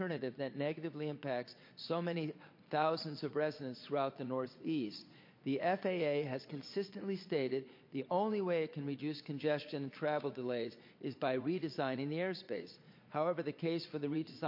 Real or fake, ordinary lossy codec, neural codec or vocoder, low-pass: fake; MP3, 32 kbps; vocoder, 22.05 kHz, 80 mel bands, Vocos; 5.4 kHz